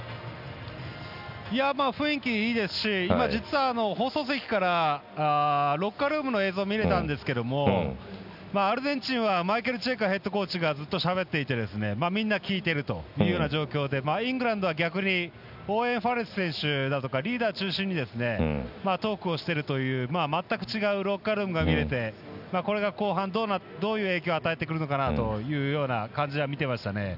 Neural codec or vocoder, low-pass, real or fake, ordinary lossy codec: none; 5.4 kHz; real; none